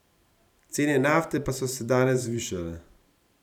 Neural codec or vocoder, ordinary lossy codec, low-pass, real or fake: vocoder, 44.1 kHz, 128 mel bands every 512 samples, BigVGAN v2; none; 19.8 kHz; fake